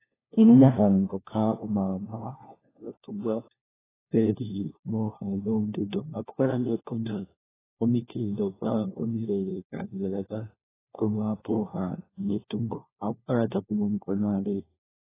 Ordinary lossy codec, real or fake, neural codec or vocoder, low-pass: AAC, 16 kbps; fake; codec, 16 kHz, 1 kbps, FunCodec, trained on LibriTTS, 50 frames a second; 3.6 kHz